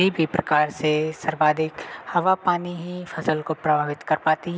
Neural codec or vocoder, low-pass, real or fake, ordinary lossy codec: none; none; real; none